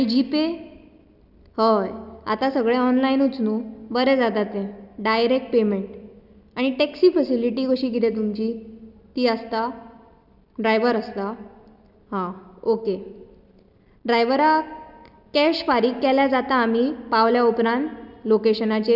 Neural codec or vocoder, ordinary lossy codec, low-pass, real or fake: none; none; 5.4 kHz; real